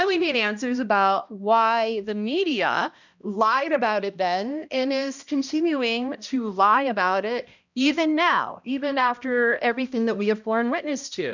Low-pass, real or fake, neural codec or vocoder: 7.2 kHz; fake; codec, 16 kHz, 1 kbps, X-Codec, HuBERT features, trained on balanced general audio